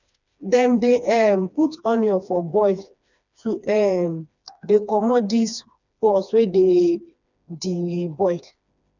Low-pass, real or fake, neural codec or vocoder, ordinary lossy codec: 7.2 kHz; fake; codec, 16 kHz, 2 kbps, FreqCodec, smaller model; none